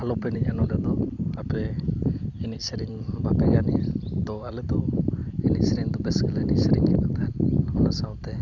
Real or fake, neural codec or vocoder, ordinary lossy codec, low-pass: real; none; none; 7.2 kHz